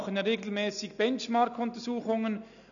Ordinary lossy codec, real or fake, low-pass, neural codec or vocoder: none; real; 7.2 kHz; none